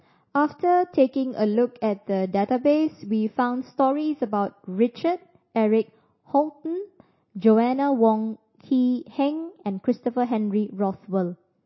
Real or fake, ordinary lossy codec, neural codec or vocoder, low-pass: real; MP3, 24 kbps; none; 7.2 kHz